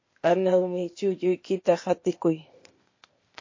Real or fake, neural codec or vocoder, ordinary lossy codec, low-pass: fake; codec, 16 kHz, 0.8 kbps, ZipCodec; MP3, 32 kbps; 7.2 kHz